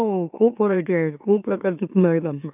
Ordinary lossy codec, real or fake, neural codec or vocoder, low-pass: none; fake; autoencoder, 44.1 kHz, a latent of 192 numbers a frame, MeloTTS; 3.6 kHz